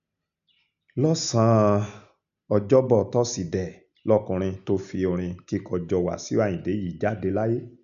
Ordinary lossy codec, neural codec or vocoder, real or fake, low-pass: none; none; real; 7.2 kHz